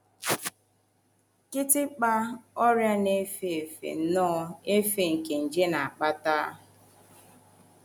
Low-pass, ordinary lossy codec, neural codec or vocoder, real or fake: none; none; none; real